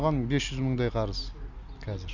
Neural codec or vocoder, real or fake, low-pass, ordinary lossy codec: none; real; 7.2 kHz; none